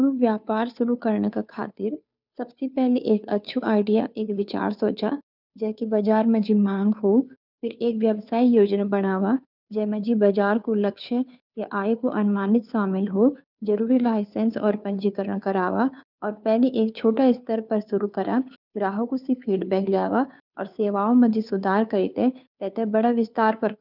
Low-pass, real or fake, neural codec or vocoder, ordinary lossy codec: 5.4 kHz; fake; codec, 16 kHz, 2 kbps, FunCodec, trained on Chinese and English, 25 frames a second; none